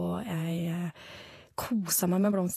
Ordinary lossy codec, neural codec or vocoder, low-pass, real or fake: AAC, 48 kbps; vocoder, 48 kHz, 128 mel bands, Vocos; 14.4 kHz; fake